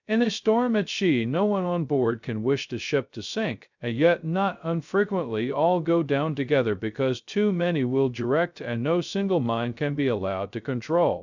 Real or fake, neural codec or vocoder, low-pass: fake; codec, 16 kHz, 0.2 kbps, FocalCodec; 7.2 kHz